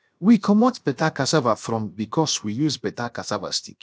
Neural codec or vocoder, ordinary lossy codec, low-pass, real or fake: codec, 16 kHz, 0.7 kbps, FocalCodec; none; none; fake